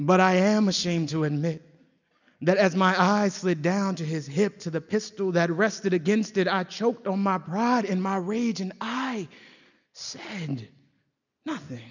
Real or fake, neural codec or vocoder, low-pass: real; none; 7.2 kHz